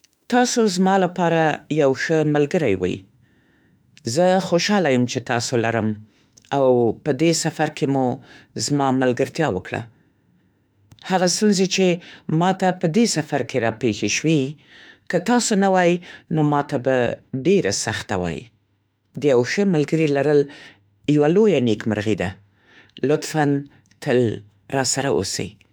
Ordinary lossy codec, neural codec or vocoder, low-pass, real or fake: none; autoencoder, 48 kHz, 32 numbers a frame, DAC-VAE, trained on Japanese speech; none; fake